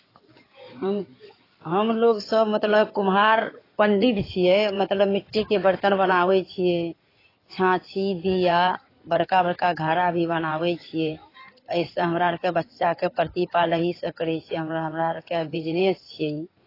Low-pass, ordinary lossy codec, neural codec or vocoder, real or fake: 5.4 kHz; AAC, 24 kbps; codec, 16 kHz in and 24 kHz out, 2.2 kbps, FireRedTTS-2 codec; fake